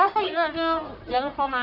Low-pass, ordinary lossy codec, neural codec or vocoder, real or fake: 5.4 kHz; none; codec, 44.1 kHz, 1.7 kbps, Pupu-Codec; fake